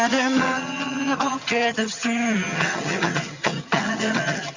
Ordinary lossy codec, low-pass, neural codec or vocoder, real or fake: Opus, 64 kbps; 7.2 kHz; vocoder, 22.05 kHz, 80 mel bands, HiFi-GAN; fake